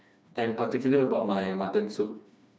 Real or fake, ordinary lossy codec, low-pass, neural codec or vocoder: fake; none; none; codec, 16 kHz, 2 kbps, FreqCodec, smaller model